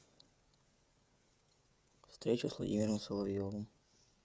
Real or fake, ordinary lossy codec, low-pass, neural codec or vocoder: fake; none; none; codec, 16 kHz, 16 kbps, FreqCodec, smaller model